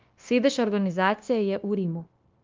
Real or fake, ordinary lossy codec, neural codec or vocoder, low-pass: fake; Opus, 32 kbps; codec, 16 kHz, 0.9 kbps, LongCat-Audio-Codec; 7.2 kHz